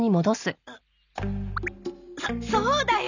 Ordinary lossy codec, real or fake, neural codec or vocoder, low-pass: none; real; none; 7.2 kHz